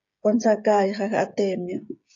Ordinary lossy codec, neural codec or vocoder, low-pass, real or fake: AAC, 64 kbps; codec, 16 kHz, 16 kbps, FreqCodec, smaller model; 7.2 kHz; fake